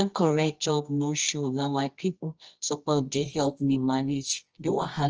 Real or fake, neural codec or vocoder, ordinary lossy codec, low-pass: fake; codec, 24 kHz, 0.9 kbps, WavTokenizer, medium music audio release; Opus, 24 kbps; 7.2 kHz